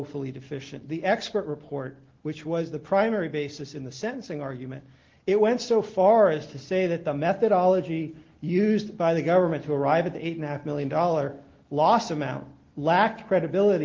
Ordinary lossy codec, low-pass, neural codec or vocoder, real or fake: Opus, 16 kbps; 7.2 kHz; none; real